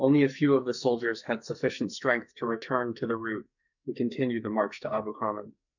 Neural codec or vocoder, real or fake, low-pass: codec, 44.1 kHz, 2.6 kbps, SNAC; fake; 7.2 kHz